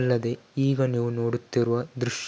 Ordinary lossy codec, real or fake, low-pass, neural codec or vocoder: none; real; none; none